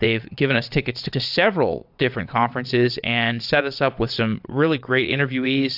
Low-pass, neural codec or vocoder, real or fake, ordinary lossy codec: 5.4 kHz; vocoder, 22.05 kHz, 80 mel bands, WaveNeXt; fake; AAC, 48 kbps